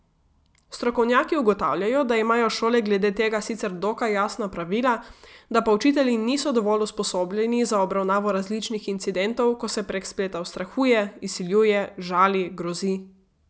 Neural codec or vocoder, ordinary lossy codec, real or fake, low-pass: none; none; real; none